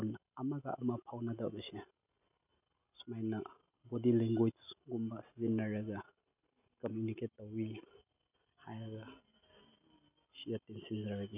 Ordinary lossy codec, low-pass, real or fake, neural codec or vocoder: none; 3.6 kHz; real; none